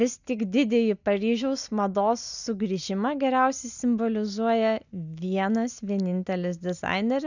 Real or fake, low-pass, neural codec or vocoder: real; 7.2 kHz; none